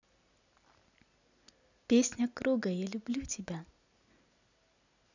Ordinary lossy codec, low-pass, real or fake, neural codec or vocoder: none; 7.2 kHz; real; none